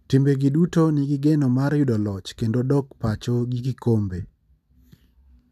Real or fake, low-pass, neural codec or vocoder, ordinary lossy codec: real; 14.4 kHz; none; none